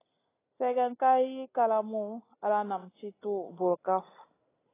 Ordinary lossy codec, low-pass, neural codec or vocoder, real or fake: AAC, 16 kbps; 3.6 kHz; none; real